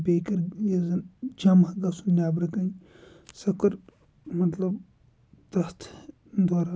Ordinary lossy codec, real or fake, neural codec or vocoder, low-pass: none; real; none; none